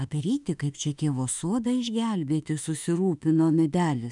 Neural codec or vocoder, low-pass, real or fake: autoencoder, 48 kHz, 32 numbers a frame, DAC-VAE, trained on Japanese speech; 10.8 kHz; fake